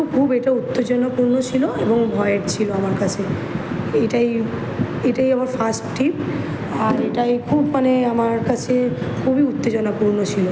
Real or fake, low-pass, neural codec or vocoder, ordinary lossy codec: real; none; none; none